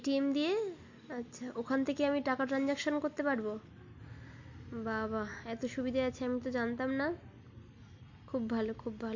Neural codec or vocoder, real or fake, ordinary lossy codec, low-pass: none; real; MP3, 64 kbps; 7.2 kHz